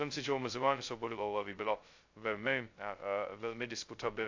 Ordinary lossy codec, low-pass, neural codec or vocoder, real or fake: AAC, 48 kbps; 7.2 kHz; codec, 16 kHz, 0.2 kbps, FocalCodec; fake